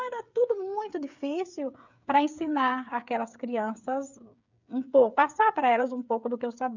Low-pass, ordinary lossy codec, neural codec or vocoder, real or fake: 7.2 kHz; none; codec, 16 kHz, 8 kbps, FreqCodec, smaller model; fake